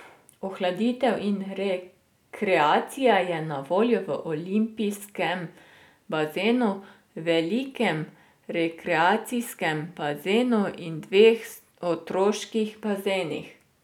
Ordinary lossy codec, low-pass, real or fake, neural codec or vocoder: none; 19.8 kHz; real; none